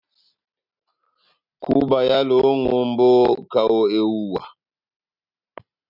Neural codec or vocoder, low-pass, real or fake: none; 5.4 kHz; real